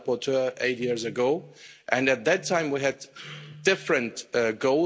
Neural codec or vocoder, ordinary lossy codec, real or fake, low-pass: none; none; real; none